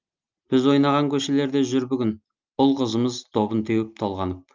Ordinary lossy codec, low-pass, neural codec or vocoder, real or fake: Opus, 24 kbps; 7.2 kHz; none; real